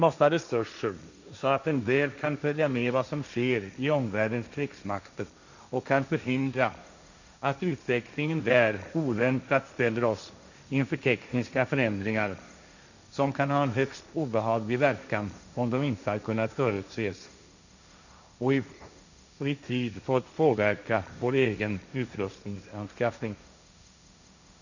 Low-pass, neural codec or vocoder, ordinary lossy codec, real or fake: 7.2 kHz; codec, 16 kHz, 1.1 kbps, Voila-Tokenizer; none; fake